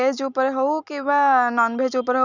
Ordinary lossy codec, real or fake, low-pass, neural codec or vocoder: none; real; 7.2 kHz; none